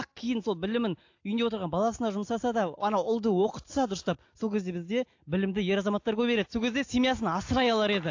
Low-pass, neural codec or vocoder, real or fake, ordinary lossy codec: 7.2 kHz; none; real; AAC, 48 kbps